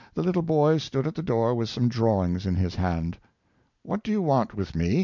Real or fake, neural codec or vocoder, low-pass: real; none; 7.2 kHz